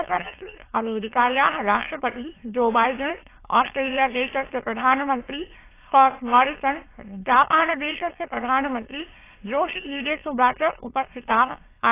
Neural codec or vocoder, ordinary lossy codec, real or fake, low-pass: autoencoder, 22.05 kHz, a latent of 192 numbers a frame, VITS, trained on many speakers; AAC, 24 kbps; fake; 3.6 kHz